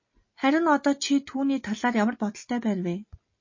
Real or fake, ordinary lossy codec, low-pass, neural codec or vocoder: real; MP3, 32 kbps; 7.2 kHz; none